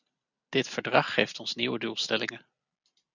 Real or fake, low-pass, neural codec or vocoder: real; 7.2 kHz; none